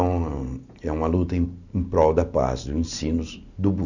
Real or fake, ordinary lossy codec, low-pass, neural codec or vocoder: real; none; 7.2 kHz; none